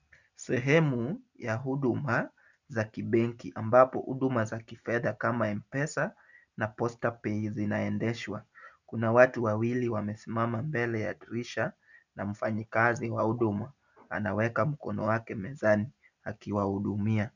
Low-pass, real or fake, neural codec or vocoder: 7.2 kHz; real; none